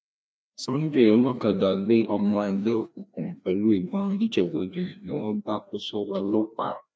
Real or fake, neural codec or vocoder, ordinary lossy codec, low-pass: fake; codec, 16 kHz, 1 kbps, FreqCodec, larger model; none; none